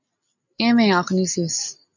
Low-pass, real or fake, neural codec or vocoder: 7.2 kHz; real; none